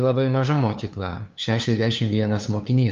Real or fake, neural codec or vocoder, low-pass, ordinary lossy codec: fake; codec, 16 kHz, 2 kbps, FunCodec, trained on LibriTTS, 25 frames a second; 7.2 kHz; Opus, 32 kbps